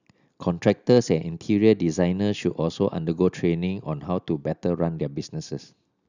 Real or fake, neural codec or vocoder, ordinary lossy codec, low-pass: real; none; none; 7.2 kHz